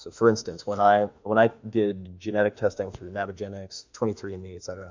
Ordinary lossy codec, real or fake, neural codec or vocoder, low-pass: MP3, 64 kbps; fake; autoencoder, 48 kHz, 32 numbers a frame, DAC-VAE, trained on Japanese speech; 7.2 kHz